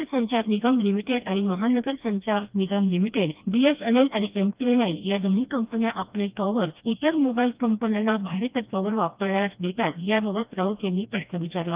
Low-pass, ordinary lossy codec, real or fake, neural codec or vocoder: 3.6 kHz; Opus, 24 kbps; fake; codec, 16 kHz, 1 kbps, FreqCodec, smaller model